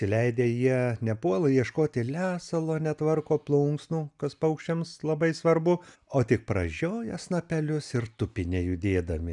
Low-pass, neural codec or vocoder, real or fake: 10.8 kHz; none; real